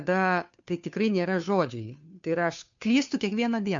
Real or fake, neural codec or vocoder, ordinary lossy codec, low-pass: fake; codec, 16 kHz, 2 kbps, FunCodec, trained on Chinese and English, 25 frames a second; MP3, 64 kbps; 7.2 kHz